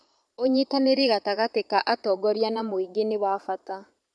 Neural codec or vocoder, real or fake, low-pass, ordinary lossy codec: vocoder, 22.05 kHz, 80 mel bands, Vocos; fake; none; none